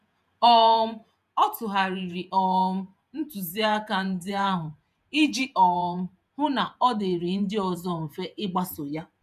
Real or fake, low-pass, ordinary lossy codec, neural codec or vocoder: fake; 14.4 kHz; none; vocoder, 48 kHz, 128 mel bands, Vocos